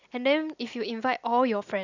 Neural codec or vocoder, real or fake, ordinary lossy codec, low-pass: none; real; none; 7.2 kHz